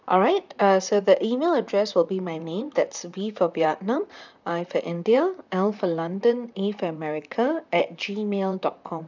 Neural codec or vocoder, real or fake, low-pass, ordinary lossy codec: vocoder, 44.1 kHz, 128 mel bands, Pupu-Vocoder; fake; 7.2 kHz; none